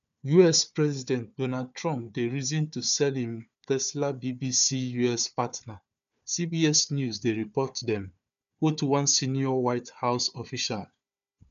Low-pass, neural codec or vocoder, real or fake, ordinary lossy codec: 7.2 kHz; codec, 16 kHz, 4 kbps, FunCodec, trained on Chinese and English, 50 frames a second; fake; none